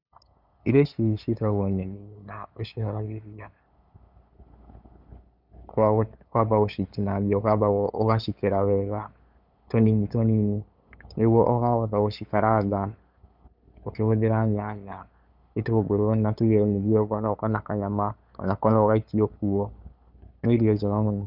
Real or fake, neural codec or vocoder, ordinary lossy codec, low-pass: fake; codec, 16 kHz, 8 kbps, FunCodec, trained on LibriTTS, 25 frames a second; none; 5.4 kHz